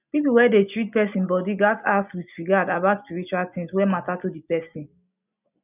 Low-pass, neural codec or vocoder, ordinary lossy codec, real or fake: 3.6 kHz; none; none; real